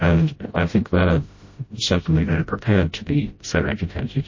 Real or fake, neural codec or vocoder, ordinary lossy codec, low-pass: fake; codec, 16 kHz, 0.5 kbps, FreqCodec, smaller model; MP3, 32 kbps; 7.2 kHz